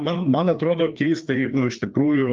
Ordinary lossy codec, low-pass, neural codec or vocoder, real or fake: Opus, 32 kbps; 7.2 kHz; codec, 16 kHz, 2 kbps, FreqCodec, larger model; fake